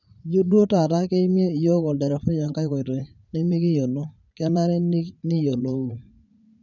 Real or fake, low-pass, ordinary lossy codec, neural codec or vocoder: fake; 7.2 kHz; none; vocoder, 44.1 kHz, 80 mel bands, Vocos